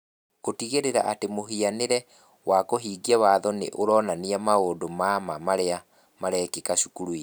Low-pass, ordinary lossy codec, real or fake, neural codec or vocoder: none; none; real; none